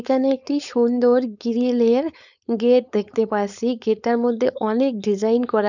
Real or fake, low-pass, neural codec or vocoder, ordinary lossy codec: fake; 7.2 kHz; codec, 16 kHz, 4.8 kbps, FACodec; none